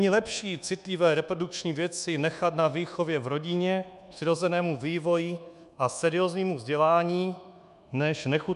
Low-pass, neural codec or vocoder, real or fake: 10.8 kHz; codec, 24 kHz, 1.2 kbps, DualCodec; fake